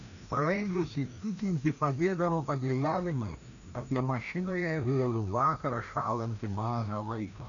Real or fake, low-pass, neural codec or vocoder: fake; 7.2 kHz; codec, 16 kHz, 1 kbps, FreqCodec, larger model